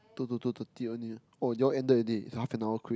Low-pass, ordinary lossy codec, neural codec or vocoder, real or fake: none; none; none; real